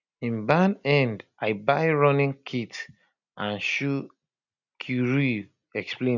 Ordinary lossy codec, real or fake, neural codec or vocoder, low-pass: none; real; none; 7.2 kHz